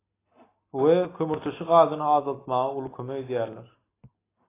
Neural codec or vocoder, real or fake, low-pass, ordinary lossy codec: none; real; 3.6 kHz; AAC, 16 kbps